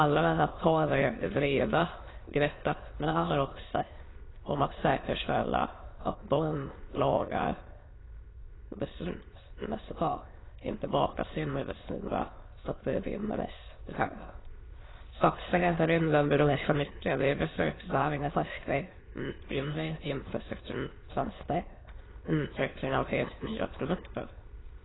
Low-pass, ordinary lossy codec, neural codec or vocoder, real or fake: 7.2 kHz; AAC, 16 kbps; autoencoder, 22.05 kHz, a latent of 192 numbers a frame, VITS, trained on many speakers; fake